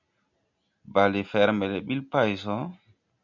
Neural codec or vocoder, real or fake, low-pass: none; real; 7.2 kHz